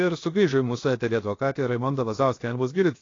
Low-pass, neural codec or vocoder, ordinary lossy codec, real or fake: 7.2 kHz; codec, 16 kHz, 0.7 kbps, FocalCodec; AAC, 32 kbps; fake